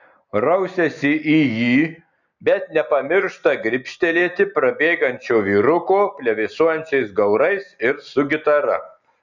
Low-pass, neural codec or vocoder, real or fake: 7.2 kHz; none; real